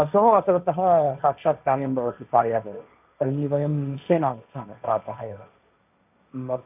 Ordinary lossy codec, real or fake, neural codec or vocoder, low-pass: none; fake; codec, 16 kHz, 1.1 kbps, Voila-Tokenizer; 3.6 kHz